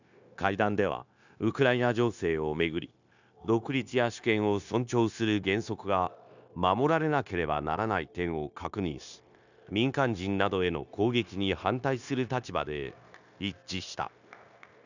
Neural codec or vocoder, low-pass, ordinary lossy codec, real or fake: codec, 16 kHz, 0.9 kbps, LongCat-Audio-Codec; 7.2 kHz; none; fake